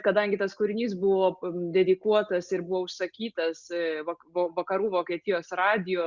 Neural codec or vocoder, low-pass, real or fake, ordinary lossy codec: none; 7.2 kHz; real; Opus, 64 kbps